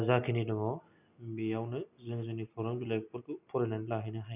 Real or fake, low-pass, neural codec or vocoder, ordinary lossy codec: real; 3.6 kHz; none; none